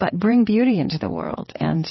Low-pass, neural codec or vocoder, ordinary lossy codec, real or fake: 7.2 kHz; vocoder, 22.05 kHz, 80 mel bands, WaveNeXt; MP3, 24 kbps; fake